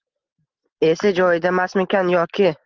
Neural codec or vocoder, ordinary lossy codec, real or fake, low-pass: none; Opus, 16 kbps; real; 7.2 kHz